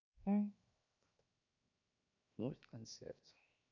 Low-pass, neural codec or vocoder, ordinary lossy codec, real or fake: 7.2 kHz; codec, 16 kHz, 0.7 kbps, FocalCodec; none; fake